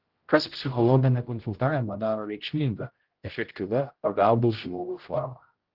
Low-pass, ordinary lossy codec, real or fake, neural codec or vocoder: 5.4 kHz; Opus, 16 kbps; fake; codec, 16 kHz, 0.5 kbps, X-Codec, HuBERT features, trained on general audio